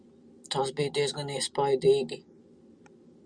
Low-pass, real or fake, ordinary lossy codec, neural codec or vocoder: 9.9 kHz; real; Opus, 64 kbps; none